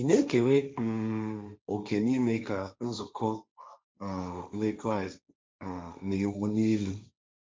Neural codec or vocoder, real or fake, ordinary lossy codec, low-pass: codec, 16 kHz, 1.1 kbps, Voila-Tokenizer; fake; none; none